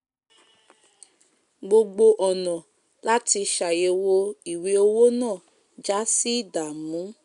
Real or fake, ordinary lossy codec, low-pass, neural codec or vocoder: real; none; 10.8 kHz; none